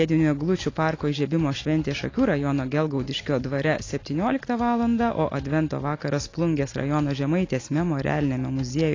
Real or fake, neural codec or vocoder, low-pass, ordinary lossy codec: real; none; 7.2 kHz; AAC, 32 kbps